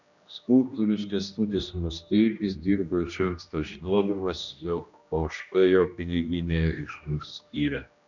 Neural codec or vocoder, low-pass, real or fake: codec, 16 kHz, 1 kbps, X-Codec, HuBERT features, trained on general audio; 7.2 kHz; fake